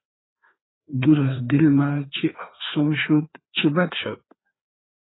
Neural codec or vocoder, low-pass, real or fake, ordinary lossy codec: codec, 16 kHz, 2 kbps, FreqCodec, larger model; 7.2 kHz; fake; AAC, 16 kbps